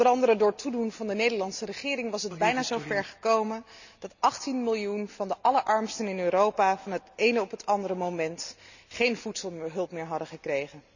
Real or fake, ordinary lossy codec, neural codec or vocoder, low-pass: real; none; none; 7.2 kHz